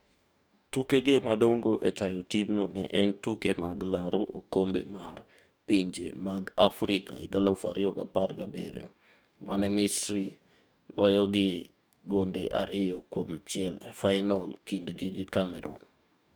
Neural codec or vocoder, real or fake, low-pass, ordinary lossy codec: codec, 44.1 kHz, 2.6 kbps, DAC; fake; none; none